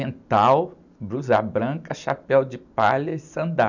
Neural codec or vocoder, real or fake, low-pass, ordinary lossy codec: none; real; 7.2 kHz; none